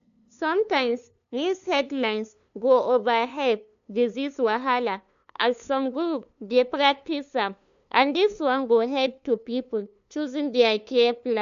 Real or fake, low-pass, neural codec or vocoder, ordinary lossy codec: fake; 7.2 kHz; codec, 16 kHz, 2 kbps, FunCodec, trained on LibriTTS, 25 frames a second; none